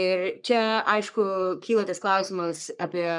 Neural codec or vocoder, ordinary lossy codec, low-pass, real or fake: codec, 44.1 kHz, 3.4 kbps, Pupu-Codec; MP3, 96 kbps; 10.8 kHz; fake